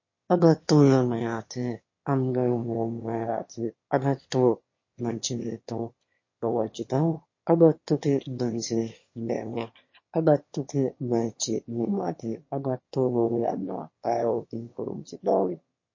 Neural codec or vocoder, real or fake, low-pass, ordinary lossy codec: autoencoder, 22.05 kHz, a latent of 192 numbers a frame, VITS, trained on one speaker; fake; 7.2 kHz; MP3, 32 kbps